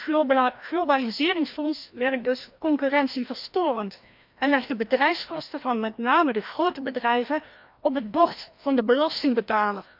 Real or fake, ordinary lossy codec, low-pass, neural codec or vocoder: fake; MP3, 48 kbps; 5.4 kHz; codec, 16 kHz, 1 kbps, FreqCodec, larger model